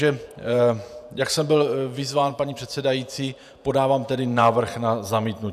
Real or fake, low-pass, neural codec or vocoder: fake; 14.4 kHz; vocoder, 48 kHz, 128 mel bands, Vocos